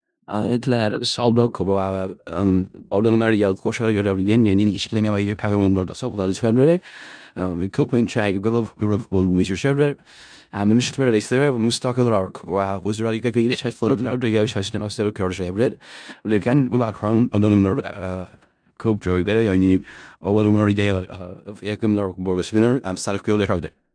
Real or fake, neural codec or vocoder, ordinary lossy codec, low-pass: fake; codec, 16 kHz in and 24 kHz out, 0.4 kbps, LongCat-Audio-Codec, four codebook decoder; MP3, 96 kbps; 9.9 kHz